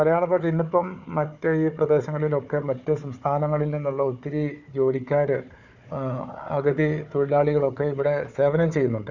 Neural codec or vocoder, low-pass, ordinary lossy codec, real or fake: codec, 16 kHz, 4 kbps, FunCodec, trained on Chinese and English, 50 frames a second; 7.2 kHz; none; fake